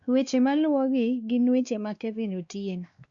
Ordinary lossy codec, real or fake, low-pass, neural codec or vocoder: Opus, 64 kbps; fake; 7.2 kHz; codec, 16 kHz, 2 kbps, X-Codec, WavLM features, trained on Multilingual LibriSpeech